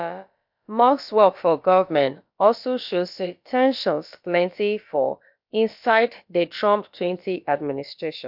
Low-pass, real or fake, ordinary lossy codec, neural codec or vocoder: 5.4 kHz; fake; MP3, 48 kbps; codec, 16 kHz, about 1 kbps, DyCAST, with the encoder's durations